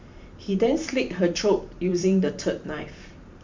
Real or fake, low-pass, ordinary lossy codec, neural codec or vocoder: fake; 7.2 kHz; MP3, 64 kbps; vocoder, 44.1 kHz, 128 mel bands every 512 samples, BigVGAN v2